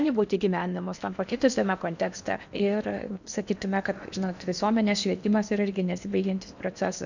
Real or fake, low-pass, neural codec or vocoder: fake; 7.2 kHz; codec, 16 kHz in and 24 kHz out, 0.8 kbps, FocalCodec, streaming, 65536 codes